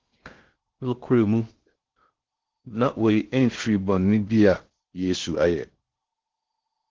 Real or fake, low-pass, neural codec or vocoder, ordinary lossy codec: fake; 7.2 kHz; codec, 16 kHz in and 24 kHz out, 0.6 kbps, FocalCodec, streaming, 4096 codes; Opus, 16 kbps